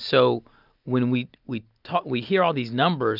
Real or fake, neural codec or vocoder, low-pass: real; none; 5.4 kHz